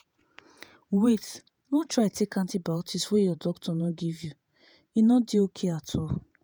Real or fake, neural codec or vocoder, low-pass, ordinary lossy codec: fake; vocoder, 48 kHz, 128 mel bands, Vocos; none; none